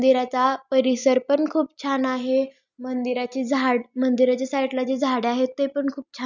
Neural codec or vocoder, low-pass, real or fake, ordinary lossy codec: none; 7.2 kHz; real; none